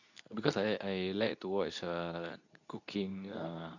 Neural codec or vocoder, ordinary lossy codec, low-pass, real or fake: codec, 24 kHz, 0.9 kbps, WavTokenizer, medium speech release version 2; none; 7.2 kHz; fake